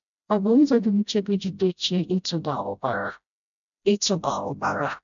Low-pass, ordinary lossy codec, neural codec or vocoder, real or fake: 7.2 kHz; none; codec, 16 kHz, 0.5 kbps, FreqCodec, smaller model; fake